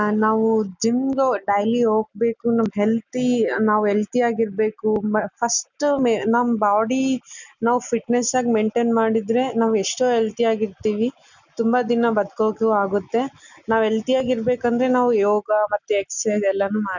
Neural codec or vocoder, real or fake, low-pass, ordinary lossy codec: none; real; 7.2 kHz; none